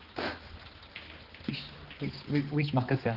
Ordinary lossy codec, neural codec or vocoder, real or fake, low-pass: Opus, 16 kbps; codec, 16 kHz, 4 kbps, X-Codec, HuBERT features, trained on balanced general audio; fake; 5.4 kHz